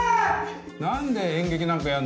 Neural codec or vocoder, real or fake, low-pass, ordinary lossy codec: none; real; none; none